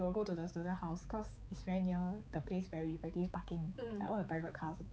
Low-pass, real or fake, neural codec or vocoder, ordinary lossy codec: none; fake; codec, 16 kHz, 4 kbps, X-Codec, HuBERT features, trained on balanced general audio; none